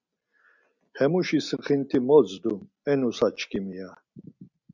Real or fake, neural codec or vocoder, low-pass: real; none; 7.2 kHz